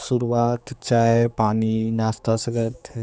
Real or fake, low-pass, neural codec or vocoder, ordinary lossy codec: fake; none; codec, 16 kHz, 4 kbps, X-Codec, HuBERT features, trained on general audio; none